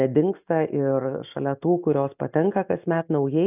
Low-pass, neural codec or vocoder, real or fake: 3.6 kHz; none; real